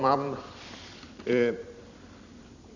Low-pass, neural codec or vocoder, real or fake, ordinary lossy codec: 7.2 kHz; vocoder, 44.1 kHz, 128 mel bands every 256 samples, BigVGAN v2; fake; none